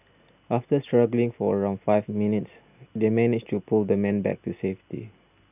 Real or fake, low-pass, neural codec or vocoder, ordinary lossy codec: real; 3.6 kHz; none; none